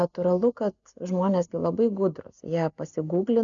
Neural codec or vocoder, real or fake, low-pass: none; real; 7.2 kHz